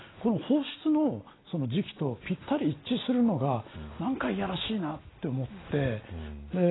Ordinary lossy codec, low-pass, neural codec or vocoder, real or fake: AAC, 16 kbps; 7.2 kHz; none; real